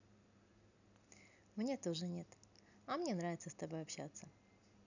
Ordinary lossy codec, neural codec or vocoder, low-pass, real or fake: none; none; 7.2 kHz; real